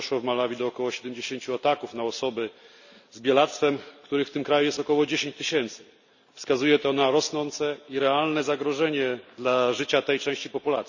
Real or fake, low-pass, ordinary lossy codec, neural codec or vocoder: real; 7.2 kHz; none; none